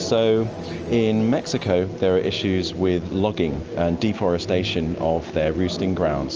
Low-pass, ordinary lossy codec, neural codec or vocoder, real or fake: 7.2 kHz; Opus, 24 kbps; none; real